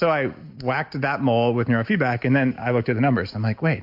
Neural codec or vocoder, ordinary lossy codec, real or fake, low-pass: none; MP3, 48 kbps; real; 5.4 kHz